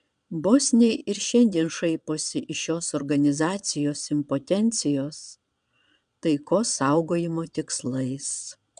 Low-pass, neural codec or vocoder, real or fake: 9.9 kHz; vocoder, 22.05 kHz, 80 mel bands, Vocos; fake